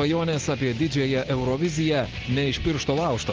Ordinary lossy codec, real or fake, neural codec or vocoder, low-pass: Opus, 16 kbps; real; none; 7.2 kHz